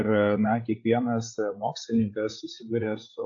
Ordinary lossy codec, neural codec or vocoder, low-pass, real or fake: AAC, 64 kbps; codec, 16 kHz, 8 kbps, FreqCodec, larger model; 7.2 kHz; fake